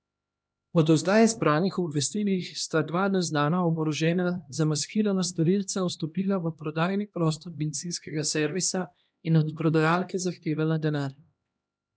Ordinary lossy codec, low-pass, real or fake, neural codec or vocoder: none; none; fake; codec, 16 kHz, 1 kbps, X-Codec, HuBERT features, trained on LibriSpeech